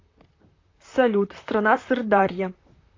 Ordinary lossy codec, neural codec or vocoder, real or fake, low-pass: AAC, 32 kbps; vocoder, 44.1 kHz, 128 mel bands, Pupu-Vocoder; fake; 7.2 kHz